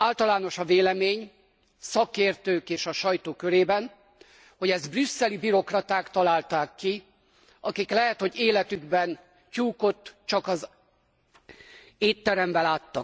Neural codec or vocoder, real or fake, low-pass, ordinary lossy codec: none; real; none; none